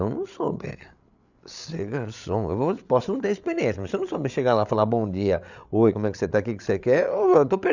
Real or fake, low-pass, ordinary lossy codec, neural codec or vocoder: fake; 7.2 kHz; none; codec, 16 kHz, 8 kbps, FreqCodec, larger model